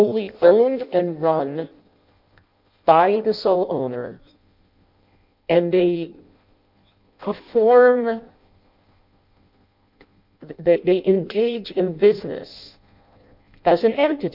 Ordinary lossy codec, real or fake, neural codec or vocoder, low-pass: MP3, 48 kbps; fake; codec, 16 kHz in and 24 kHz out, 0.6 kbps, FireRedTTS-2 codec; 5.4 kHz